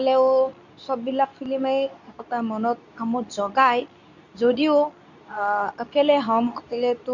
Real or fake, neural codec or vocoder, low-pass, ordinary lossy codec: fake; codec, 24 kHz, 0.9 kbps, WavTokenizer, medium speech release version 2; 7.2 kHz; none